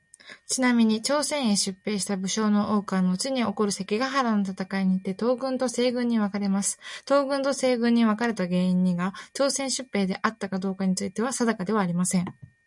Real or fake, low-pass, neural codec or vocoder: real; 10.8 kHz; none